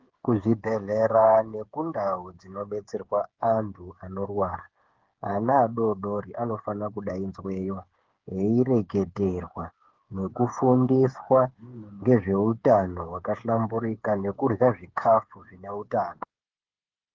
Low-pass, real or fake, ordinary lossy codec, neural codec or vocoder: 7.2 kHz; fake; Opus, 16 kbps; codec, 16 kHz, 8 kbps, FreqCodec, smaller model